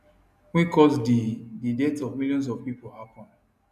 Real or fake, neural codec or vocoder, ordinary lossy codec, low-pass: real; none; none; 14.4 kHz